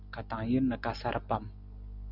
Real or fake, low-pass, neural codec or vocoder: real; 5.4 kHz; none